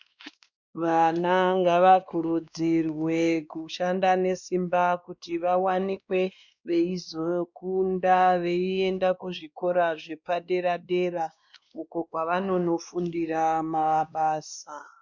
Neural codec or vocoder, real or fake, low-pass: codec, 16 kHz, 2 kbps, X-Codec, WavLM features, trained on Multilingual LibriSpeech; fake; 7.2 kHz